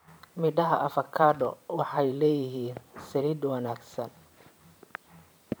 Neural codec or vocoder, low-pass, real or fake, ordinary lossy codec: vocoder, 44.1 kHz, 128 mel bands every 512 samples, BigVGAN v2; none; fake; none